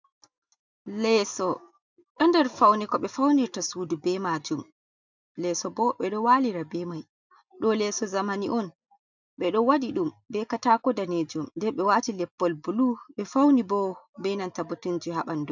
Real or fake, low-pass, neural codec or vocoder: real; 7.2 kHz; none